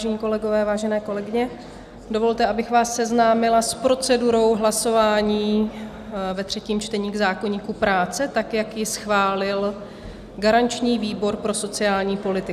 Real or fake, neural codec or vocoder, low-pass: real; none; 14.4 kHz